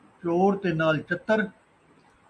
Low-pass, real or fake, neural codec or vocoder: 9.9 kHz; real; none